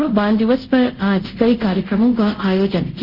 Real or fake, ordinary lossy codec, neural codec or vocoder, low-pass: fake; Opus, 32 kbps; codec, 24 kHz, 0.5 kbps, DualCodec; 5.4 kHz